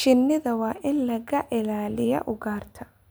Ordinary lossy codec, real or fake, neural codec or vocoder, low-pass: none; real; none; none